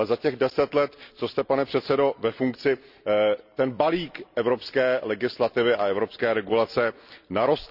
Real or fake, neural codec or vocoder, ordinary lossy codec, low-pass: real; none; none; 5.4 kHz